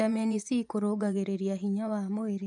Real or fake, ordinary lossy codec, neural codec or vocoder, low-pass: fake; none; vocoder, 24 kHz, 100 mel bands, Vocos; 10.8 kHz